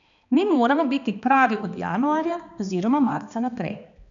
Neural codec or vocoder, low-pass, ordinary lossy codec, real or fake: codec, 16 kHz, 2 kbps, X-Codec, HuBERT features, trained on balanced general audio; 7.2 kHz; none; fake